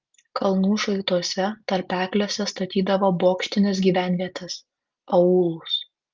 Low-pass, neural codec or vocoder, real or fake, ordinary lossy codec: 7.2 kHz; none; real; Opus, 32 kbps